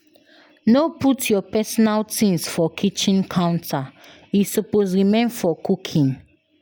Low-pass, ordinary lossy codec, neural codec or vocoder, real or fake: none; none; none; real